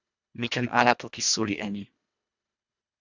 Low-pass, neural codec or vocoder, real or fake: 7.2 kHz; codec, 24 kHz, 1.5 kbps, HILCodec; fake